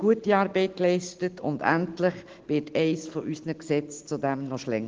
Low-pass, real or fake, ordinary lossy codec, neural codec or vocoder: 7.2 kHz; real; Opus, 16 kbps; none